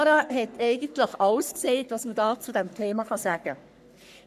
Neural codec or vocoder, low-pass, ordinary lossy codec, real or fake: codec, 44.1 kHz, 3.4 kbps, Pupu-Codec; 14.4 kHz; none; fake